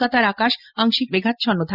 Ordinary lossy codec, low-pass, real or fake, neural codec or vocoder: Opus, 64 kbps; 5.4 kHz; real; none